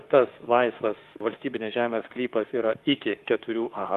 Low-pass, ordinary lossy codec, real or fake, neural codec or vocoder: 14.4 kHz; Opus, 24 kbps; fake; autoencoder, 48 kHz, 32 numbers a frame, DAC-VAE, trained on Japanese speech